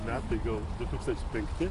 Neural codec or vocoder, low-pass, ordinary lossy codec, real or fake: none; 10.8 kHz; AAC, 48 kbps; real